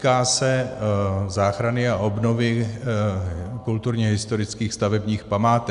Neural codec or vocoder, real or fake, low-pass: none; real; 10.8 kHz